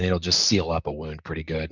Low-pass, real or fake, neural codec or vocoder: 7.2 kHz; fake; vocoder, 44.1 kHz, 128 mel bands, Pupu-Vocoder